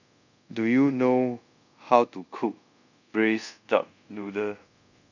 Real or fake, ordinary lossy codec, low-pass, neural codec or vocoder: fake; none; 7.2 kHz; codec, 24 kHz, 0.5 kbps, DualCodec